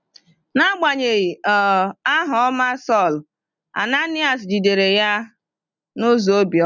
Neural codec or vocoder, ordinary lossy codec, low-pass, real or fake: none; none; 7.2 kHz; real